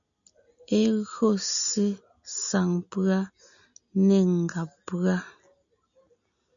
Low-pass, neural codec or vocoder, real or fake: 7.2 kHz; none; real